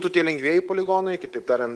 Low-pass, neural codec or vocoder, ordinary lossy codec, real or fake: 10.8 kHz; codec, 24 kHz, 3.1 kbps, DualCodec; Opus, 16 kbps; fake